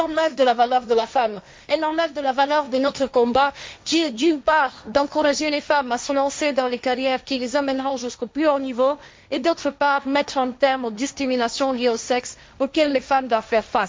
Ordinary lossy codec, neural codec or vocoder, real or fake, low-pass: none; codec, 16 kHz, 1.1 kbps, Voila-Tokenizer; fake; none